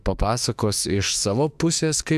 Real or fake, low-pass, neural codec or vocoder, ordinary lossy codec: fake; 14.4 kHz; autoencoder, 48 kHz, 32 numbers a frame, DAC-VAE, trained on Japanese speech; AAC, 96 kbps